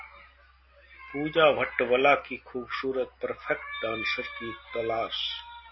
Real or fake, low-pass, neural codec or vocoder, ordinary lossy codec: real; 7.2 kHz; none; MP3, 24 kbps